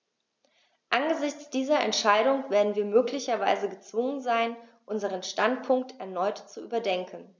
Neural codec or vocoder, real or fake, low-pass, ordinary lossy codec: none; real; none; none